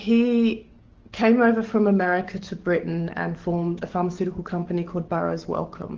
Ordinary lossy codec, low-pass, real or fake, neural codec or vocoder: Opus, 16 kbps; 7.2 kHz; fake; codec, 44.1 kHz, 7.8 kbps, DAC